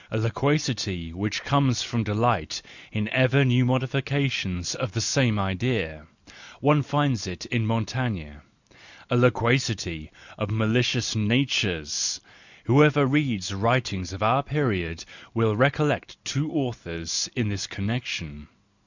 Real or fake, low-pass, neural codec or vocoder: real; 7.2 kHz; none